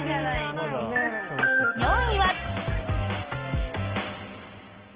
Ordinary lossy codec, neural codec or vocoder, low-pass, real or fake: Opus, 16 kbps; none; 3.6 kHz; real